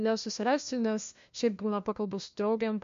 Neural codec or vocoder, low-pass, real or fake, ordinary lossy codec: codec, 16 kHz, 1 kbps, FunCodec, trained on LibriTTS, 50 frames a second; 7.2 kHz; fake; MP3, 48 kbps